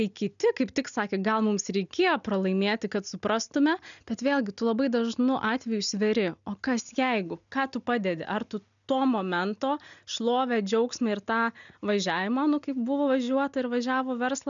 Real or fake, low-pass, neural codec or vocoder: real; 7.2 kHz; none